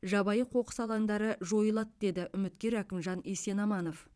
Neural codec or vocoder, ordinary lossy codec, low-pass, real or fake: vocoder, 22.05 kHz, 80 mel bands, Vocos; none; none; fake